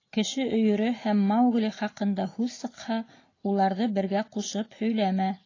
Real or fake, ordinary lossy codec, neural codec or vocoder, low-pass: real; AAC, 32 kbps; none; 7.2 kHz